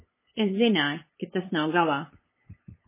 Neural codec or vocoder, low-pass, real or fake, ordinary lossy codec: codec, 16 kHz, 8 kbps, FunCodec, trained on LibriTTS, 25 frames a second; 3.6 kHz; fake; MP3, 16 kbps